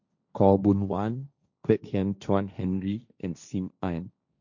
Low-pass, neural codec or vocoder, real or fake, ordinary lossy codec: 7.2 kHz; codec, 16 kHz, 1.1 kbps, Voila-Tokenizer; fake; none